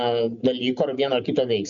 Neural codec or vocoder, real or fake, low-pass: none; real; 7.2 kHz